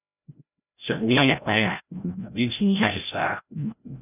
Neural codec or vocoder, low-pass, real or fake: codec, 16 kHz, 0.5 kbps, FreqCodec, larger model; 3.6 kHz; fake